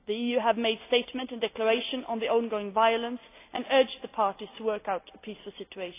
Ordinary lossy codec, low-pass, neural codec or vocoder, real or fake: AAC, 24 kbps; 3.6 kHz; none; real